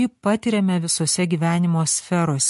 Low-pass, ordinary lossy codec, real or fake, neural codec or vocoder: 14.4 kHz; MP3, 48 kbps; real; none